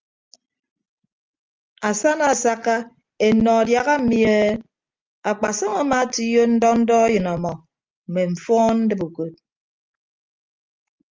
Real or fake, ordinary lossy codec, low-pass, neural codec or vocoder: real; Opus, 24 kbps; 7.2 kHz; none